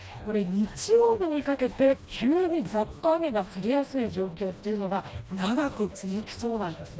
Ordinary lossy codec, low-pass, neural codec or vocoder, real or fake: none; none; codec, 16 kHz, 1 kbps, FreqCodec, smaller model; fake